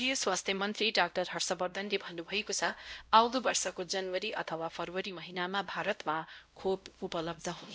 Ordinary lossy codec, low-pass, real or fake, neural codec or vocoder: none; none; fake; codec, 16 kHz, 0.5 kbps, X-Codec, WavLM features, trained on Multilingual LibriSpeech